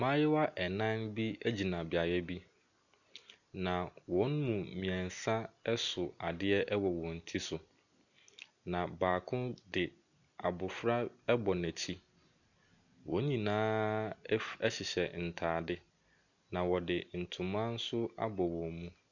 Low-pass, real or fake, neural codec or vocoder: 7.2 kHz; real; none